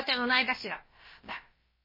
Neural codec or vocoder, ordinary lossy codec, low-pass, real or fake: codec, 16 kHz, about 1 kbps, DyCAST, with the encoder's durations; MP3, 24 kbps; 5.4 kHz; fake